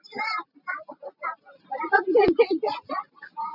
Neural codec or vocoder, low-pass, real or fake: none; 5.4 kHz; real